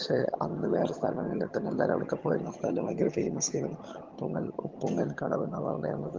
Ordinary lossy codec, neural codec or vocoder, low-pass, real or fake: Opus, 16 kbps; vocoder, 22.05 kHz, 80 mel bands, HiFi-GAN; 7.2 kHz; fake